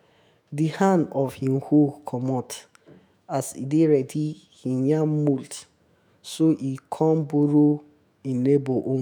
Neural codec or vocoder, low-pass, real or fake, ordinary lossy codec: autoencoder, 48 kHz, 128 numbers a frame, DAC-VAE, trained on Japanese speech; 19.8 kHz; fake; none